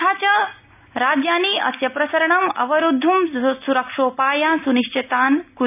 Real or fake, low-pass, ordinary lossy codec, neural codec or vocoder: real; 3.6 kHz; none; none